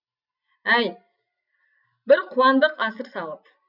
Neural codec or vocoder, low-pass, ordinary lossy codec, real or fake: none; 5.4 kHz; none; real